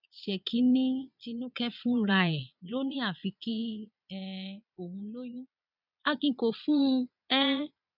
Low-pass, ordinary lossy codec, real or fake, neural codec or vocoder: 5.4 kHz; none; fake; vocoder, 22.05 kHz, 80 mel bands, Vocos